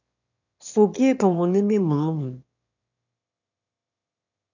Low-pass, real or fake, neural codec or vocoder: 7.2 kHz; fake; autoencoder, 22.05 kHz, a latent of 192 numbers a frame, VITS, trained on one speaker